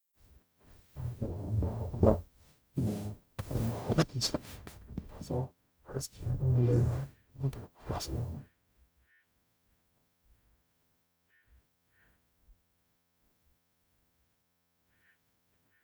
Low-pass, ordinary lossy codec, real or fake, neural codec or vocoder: none; none; fake; codec, 44.1 kHz, 0.9 kbps, DAC